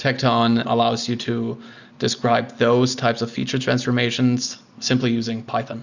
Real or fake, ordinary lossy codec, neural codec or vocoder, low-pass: real; Opus, 64 kbps; none; 7.2 kHz